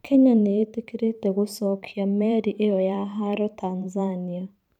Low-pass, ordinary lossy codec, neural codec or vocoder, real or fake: 19.8 kHz; none; vocoder, 44.1 kHz, 128 mel bands every 512 samples, BigVGAN v2; fake